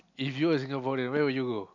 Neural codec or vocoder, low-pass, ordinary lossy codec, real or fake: none; 7.2 kHz; none; real